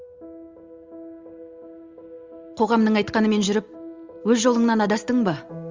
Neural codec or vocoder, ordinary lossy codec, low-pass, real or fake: none; Opus, 32 kbps; 7.2 kHz; real